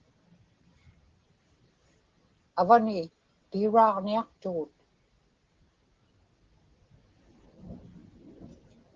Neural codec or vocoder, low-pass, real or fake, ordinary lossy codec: none; 7.2 kHz; real; Opus, 16 kbps